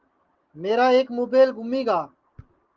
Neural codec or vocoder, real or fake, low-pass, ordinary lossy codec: none; real; 7.2 kHz; Opus, 16 kbps